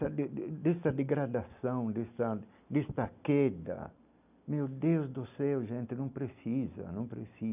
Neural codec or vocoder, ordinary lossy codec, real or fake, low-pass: none; none; real; 3.6 kHz